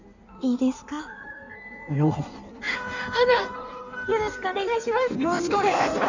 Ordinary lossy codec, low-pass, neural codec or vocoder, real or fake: none; 7.2 kHz; codec, 16 kHz in and 24 kHz out, 1.1 kbps, FireRedTTS-2 codec; fake